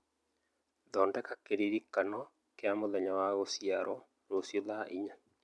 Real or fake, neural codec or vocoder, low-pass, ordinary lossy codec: real; none; none; none